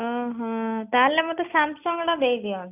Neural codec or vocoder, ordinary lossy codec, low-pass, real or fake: none; none; 3.6 kHz; real